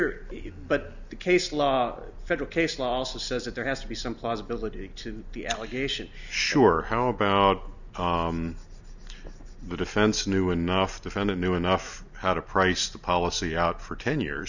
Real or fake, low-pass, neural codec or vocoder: real; 7.2 kHz; none